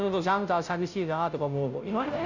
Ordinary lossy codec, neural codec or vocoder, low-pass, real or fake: none; codec, 16 kHz, 0.5 kbps, FunCodec, trained on Chinese and English, 25 frames a second; 7.2 kHz; fake